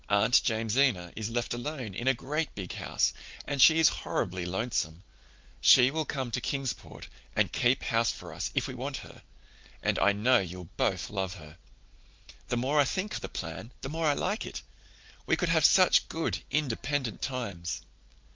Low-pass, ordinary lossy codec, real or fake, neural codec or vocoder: 7.2 kHz; Opus, 32 kbps; real; none